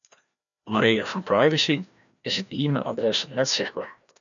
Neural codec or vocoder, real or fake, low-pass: codec, 16 kHz, 1 kbps, FreqCodec, larger model; fake; 7.2 kHz